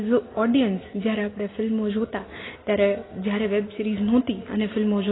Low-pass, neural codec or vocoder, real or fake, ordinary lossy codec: 7.2 kHz; none; real; AAC, 16 kbps